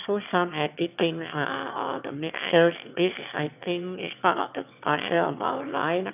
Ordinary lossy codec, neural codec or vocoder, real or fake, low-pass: none; autoencoder, 22.05 kHz, a latent of 192 numbers a frame, VITS, trained on one speaker; fake; 3.6 kHz